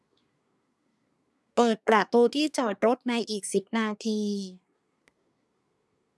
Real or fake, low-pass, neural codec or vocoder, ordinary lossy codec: fake; none; codec, 24 kHz, 1 kbps, SNAC; none